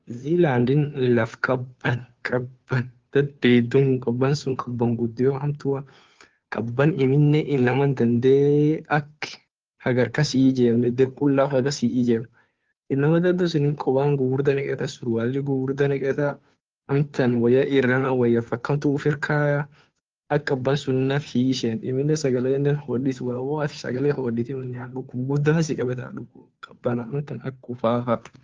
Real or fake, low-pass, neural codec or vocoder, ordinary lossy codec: fake; 7.2 kHz; codec, 16 kHz, 2 kbps, FunCodec, trained on Chinese and English, 25 frames a second; Opus, 32 kbps